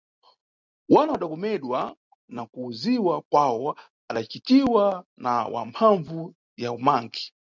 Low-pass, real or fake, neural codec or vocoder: 7.2 kHz; real; none